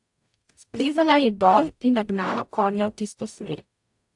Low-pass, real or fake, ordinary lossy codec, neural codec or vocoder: 10.8 kHz; fake; AAC, 64 kbps; codec, 44.1 kHz, 0.9 kbps, DAC